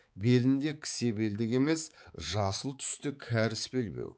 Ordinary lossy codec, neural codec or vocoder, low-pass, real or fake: none; codec, 16 kHz, 4 kbps, X-Codec, HuBERT features, trained on balanced general audio; none; fake